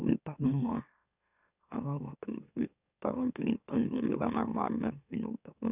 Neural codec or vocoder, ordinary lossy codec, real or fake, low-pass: autoencoder, 44.1 kHz, a latent of 192 numbers a frame, MeloTTS; none; fake; 3.6 kHz